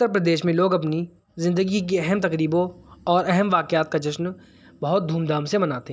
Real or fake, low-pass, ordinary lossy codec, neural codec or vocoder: real; none; none; none